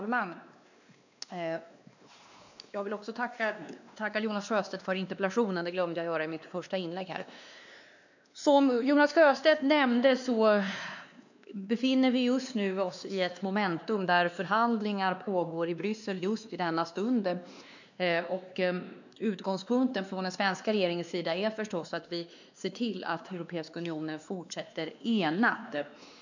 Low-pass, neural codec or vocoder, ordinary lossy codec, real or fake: 7.2 kHz; codec, 16 kHz, 2 kbps, X-Codec, WavLM features, trained on Multilingual LibriSpeech; none; fake